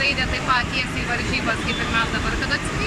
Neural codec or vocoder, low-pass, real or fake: vocoder, 44.1 kHz, 128 mel bands every 512 samples, BigVGAN v2; 14.4 kHz; fake